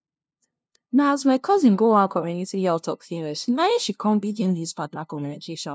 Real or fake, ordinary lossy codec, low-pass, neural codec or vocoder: fake; none; none; codec, 16 kHz, 0.5 kbps, FunCodec, trained on LibriTTS, 25 frames a second